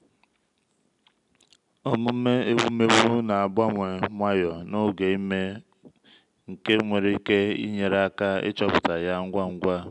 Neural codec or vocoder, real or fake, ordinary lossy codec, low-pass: none; real; none; 10.8 kHz